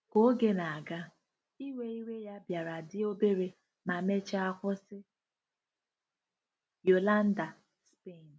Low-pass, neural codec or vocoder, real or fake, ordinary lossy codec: none; none; real; none